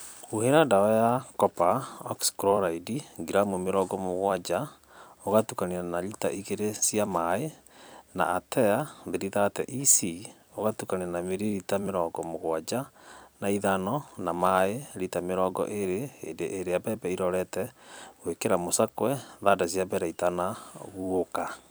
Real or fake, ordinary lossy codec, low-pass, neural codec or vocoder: fake; none; none; vocoder, 44.1 kHz, 128 mel bands every 256 samples, BigVGAN v2